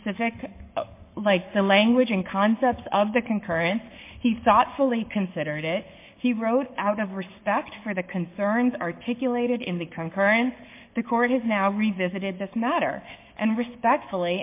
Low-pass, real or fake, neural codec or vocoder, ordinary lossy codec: 3.6 kHz; fake; codec, 44.1 kHz, 7.8 kbps, Pupu-Codec; MP3, 24 kbps